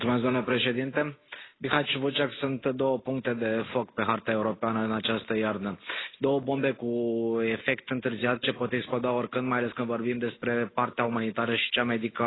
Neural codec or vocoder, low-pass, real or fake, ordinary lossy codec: none; 7.2 kHz; real; AAC, 16 kbps